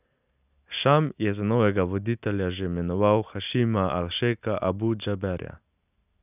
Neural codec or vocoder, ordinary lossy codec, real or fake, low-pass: none; none; real; 3.6 kHz